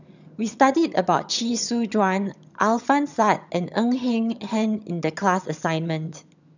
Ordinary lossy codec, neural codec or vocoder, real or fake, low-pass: none; vocoder, 22.05 kHz, 80 mel bands, HiFi-GAN; fake; 7.2 kHz